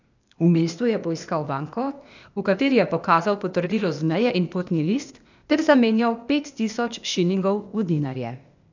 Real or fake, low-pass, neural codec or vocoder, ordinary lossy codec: fake; 7.2 kHz; codec, 16 kHz, 0.8 kbps, ZipCodec; none